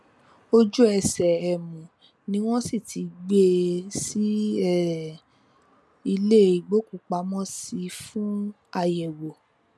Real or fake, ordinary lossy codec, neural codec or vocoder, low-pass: real; none; none; none